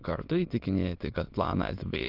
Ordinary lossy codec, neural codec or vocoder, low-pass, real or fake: Opus, 32 kbps; autoencoder, 22.05 kHz, a latent of 192 numbers a frame, VITS, trained on many speakers; 5.4 kHz; fake